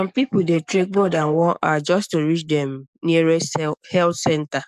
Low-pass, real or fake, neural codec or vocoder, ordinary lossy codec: 14.4 kHz; fake; codec, 44.1 kHz, 7.8 kbps, DAC; none